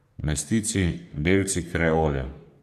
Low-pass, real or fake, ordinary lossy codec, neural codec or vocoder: 14.4 kHz; fake; none; codec, 44.1 kHz, 3.4 kbps, Pupu-Codec